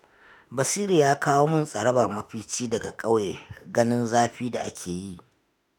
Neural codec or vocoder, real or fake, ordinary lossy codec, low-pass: autoencoder, 48 kHz, 32 numbers a frame, DAC-VAE, trained on Japanese speech; fake; none; none